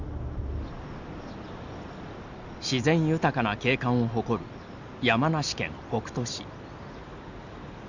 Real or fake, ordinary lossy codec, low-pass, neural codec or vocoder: real; none; 7.2 kHz; none